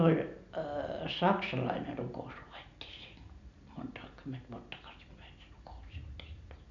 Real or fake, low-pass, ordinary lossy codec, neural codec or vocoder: real; 7.2 kHz; none; none